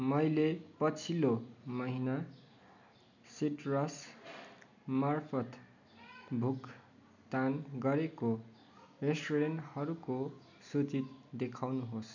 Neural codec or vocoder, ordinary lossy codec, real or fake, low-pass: none; none; real; 7.2 kHz